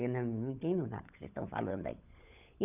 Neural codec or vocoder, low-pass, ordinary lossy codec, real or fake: codec, 16 kHz, 16 kbps, FunCodec, trained on LibriTTS, 50 frames a second; 3.6 kHz; none; fake